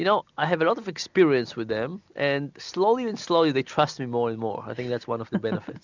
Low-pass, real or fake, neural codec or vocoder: 7.2 kHz; real; none